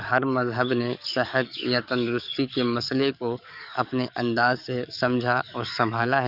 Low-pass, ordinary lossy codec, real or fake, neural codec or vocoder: 5.4 kHz; none; fake; codec, 44.1 kHz, 7.8 kbps, DAC